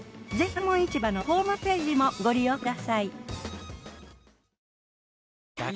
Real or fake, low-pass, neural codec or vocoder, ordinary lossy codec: real; none; none; none